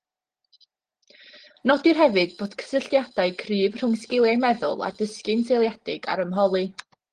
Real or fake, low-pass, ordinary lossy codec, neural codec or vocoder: real; 9.9 kHz; Opus, 16 kbps; none